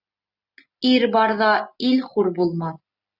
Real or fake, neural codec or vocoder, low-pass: real; none; 5.4 kHz